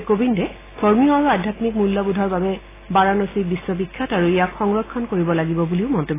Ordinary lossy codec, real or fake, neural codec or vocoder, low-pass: AAC, 16 kbps; real; none; 3.6 kHz